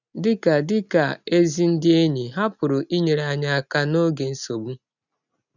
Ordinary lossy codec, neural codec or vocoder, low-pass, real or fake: none; none; 7.2 kHz; real